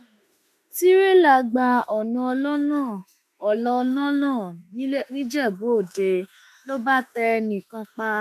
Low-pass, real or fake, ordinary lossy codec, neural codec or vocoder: 14.4 kHz; fake; MP3, 96 kbps; autoencoder, 48 kHz, 32 numbers a frame, DAC-VAE, trained on Japanese speech